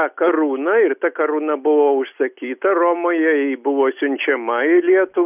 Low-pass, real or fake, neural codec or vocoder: 3.6 kHz; real; none